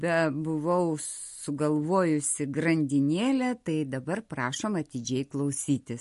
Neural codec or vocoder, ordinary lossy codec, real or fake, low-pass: none; MP3, 48 kbps; real; 14.4 kHz